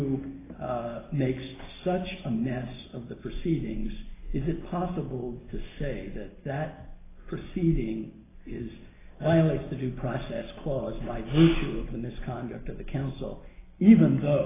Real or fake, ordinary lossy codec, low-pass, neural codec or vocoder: real; AAC, 16 kbps; 3.6 kHz; none